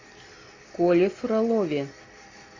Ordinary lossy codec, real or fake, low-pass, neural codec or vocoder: AAC, 32 kbps; real; 7.2 kHz; none